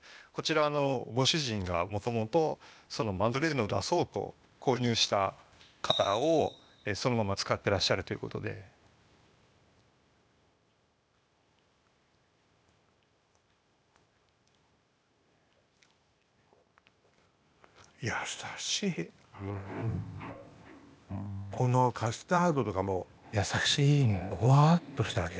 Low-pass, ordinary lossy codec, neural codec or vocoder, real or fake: none; none; codec, 16 kHz, 0.8 kbps, ZipCodec; fake